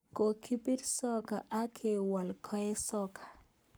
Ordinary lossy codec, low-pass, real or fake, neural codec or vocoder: none; none; fake; codec, 44.1 kHz, 7.8 kbps, Pupu-Codec